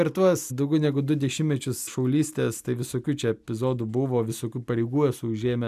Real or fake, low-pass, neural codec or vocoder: real; 14.4 kHz; none